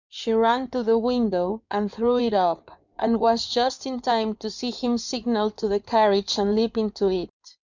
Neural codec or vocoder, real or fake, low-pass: codec, 16 kHz in and 24 kHz out, 2.2 kbps, FireRedTTS-2 codec; fake; 7.2 kHz